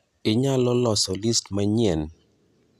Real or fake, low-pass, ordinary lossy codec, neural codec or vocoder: real; 14.4 kHz; none; none